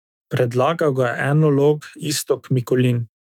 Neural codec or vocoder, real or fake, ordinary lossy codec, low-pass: autoencoder, 48 kHz, 128 numbers a frame, DAC-VAE, trained on Japanese speech; fake; none; 19.8 kHz